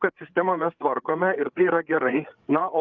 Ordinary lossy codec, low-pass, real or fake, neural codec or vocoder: Opus, 24 kbps; 7.2 kHz; fake; codec, 16 kHz, 8 kbps, FreqCodec, larger model